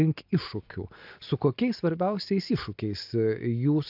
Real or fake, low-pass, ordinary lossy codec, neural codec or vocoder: real; 5.4 kHz; AAC, 48 kbps; none